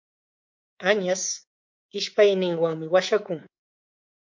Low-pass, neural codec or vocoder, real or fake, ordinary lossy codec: 7.2 kHz; codec, 24 kHz, 3.1 kbps, DualCodec; fake; MP3, 48 kbps